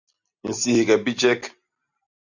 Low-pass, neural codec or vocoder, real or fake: 7.2 kHz; none; real